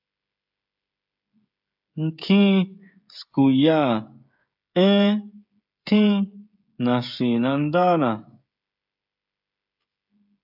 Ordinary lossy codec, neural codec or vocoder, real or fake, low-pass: AAC, 48 kbps; codec, 16 kHz, 16 kbps, FreqCodec, smaller model; fake; 5.4 kHz